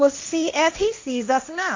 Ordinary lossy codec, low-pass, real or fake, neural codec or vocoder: MP3, 48 kbps; 7.2 kHz; fake; codec, 16 kHz, 1.1 kbps, Voila-Tokenizer